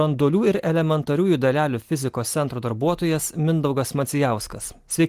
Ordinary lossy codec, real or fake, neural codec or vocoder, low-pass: Opus, 24 kbps; real; none; 14.4 kHz